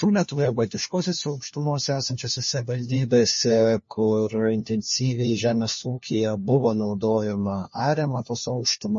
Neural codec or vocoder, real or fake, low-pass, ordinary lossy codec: codec, 16 kHz, 1 kbps, FunCodec, trained on LibriTTS, 50 frames a second; fake; 7.2 kHz; MP3, 32 kbps